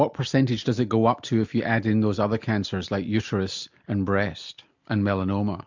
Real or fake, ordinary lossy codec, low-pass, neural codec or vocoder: real; MP3, 64 kbps; 7.2 kHz; none